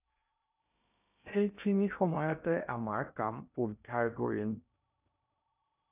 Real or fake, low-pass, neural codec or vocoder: fake; 3.6 kHz; codec, 16 kHz in and 24 kHz out, 0.6 kbps, FocalCodec, streaming, 2048 codes